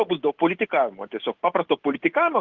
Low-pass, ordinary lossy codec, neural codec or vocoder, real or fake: 7.2 kHz; Opus, 16 kbps; none; real